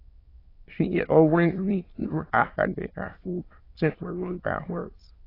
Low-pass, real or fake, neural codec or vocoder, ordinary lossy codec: 5.4 kHz; fake; autoencoder, 22.05 kHz, a latent of 192 numbers a frame, VITS, trained on many speakers; AAC, 24 kbps